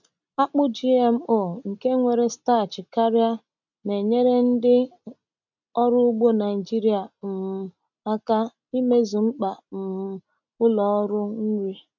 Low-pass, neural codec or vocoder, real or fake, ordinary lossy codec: 7.2 kHz; none; real; none